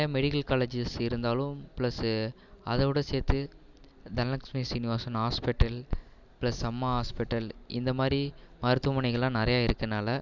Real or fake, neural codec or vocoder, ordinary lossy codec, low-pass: real; none; none; 7.2 kHz